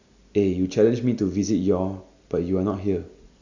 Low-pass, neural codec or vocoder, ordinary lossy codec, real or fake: 7.2 kHz; none; Opus, 64 kbps; real